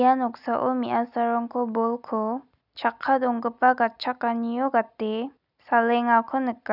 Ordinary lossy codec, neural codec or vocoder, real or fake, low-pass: none; none; real; 5.4 kHz